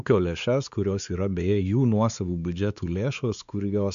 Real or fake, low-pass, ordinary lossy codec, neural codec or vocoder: fake; 7.2 kHz; AAC, 96 kbps; codec, 16 kHz, 4 kbps, X-Codec, WavLM features, trained on Multilingual LibriSpeech